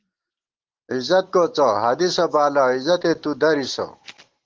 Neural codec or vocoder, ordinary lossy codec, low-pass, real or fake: none; Opus, 16 kbps; 7.2 kHz; real